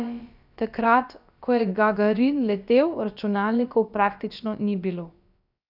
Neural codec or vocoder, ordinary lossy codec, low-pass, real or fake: codec, 16 kHz, about 1 kbps, DyCAST, with the encoder's durations; none; 5.4 kHz; fake